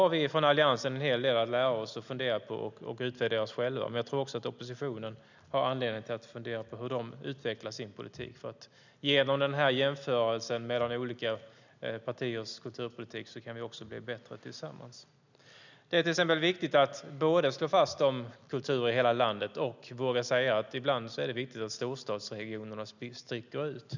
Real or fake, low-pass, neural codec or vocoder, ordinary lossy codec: real; 7.2 kHz; none; none